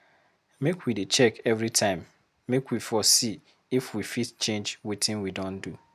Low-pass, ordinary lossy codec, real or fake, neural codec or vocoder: 14.4 kHz; none; real; none